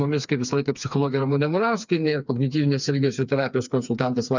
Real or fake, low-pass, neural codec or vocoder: fake; 7.2 kHz; codec, 16 kHz, 4 kbps, FreqCodec, smaller model